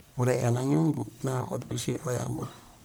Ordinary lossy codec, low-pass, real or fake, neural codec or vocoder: none; none; fake; codec, 44.1 kHz, 1.7 kbps, Pupu-Codec